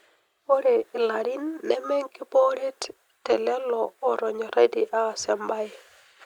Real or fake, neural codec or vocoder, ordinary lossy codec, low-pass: real; none; Opus, 64 kbps; 19.8 kHz